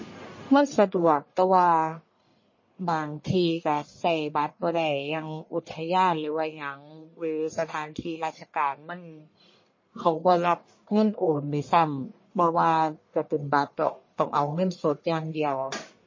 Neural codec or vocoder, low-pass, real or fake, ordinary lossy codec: codec, 44.1 kHz, 1.7 kbps, Pupu-Codec; 7.2 kHz; fake; MP3, 32 kbps